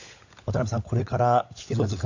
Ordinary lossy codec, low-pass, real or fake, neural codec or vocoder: AAC, 48 kbps; 7.2 kHz; fake; codec, 16 kHz, 16 kbps, FunCodec, trained on LibriTTS, 50 frames a second